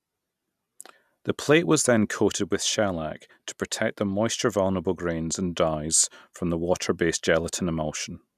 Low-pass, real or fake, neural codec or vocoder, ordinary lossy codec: 14.4 kHz; real; none; none